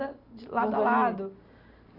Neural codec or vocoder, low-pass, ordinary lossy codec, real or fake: none; 5.4 kHz; none; real